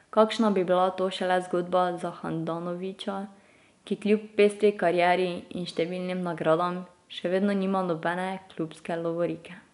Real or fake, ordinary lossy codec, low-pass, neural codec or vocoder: real; none; 10.8 kHz; none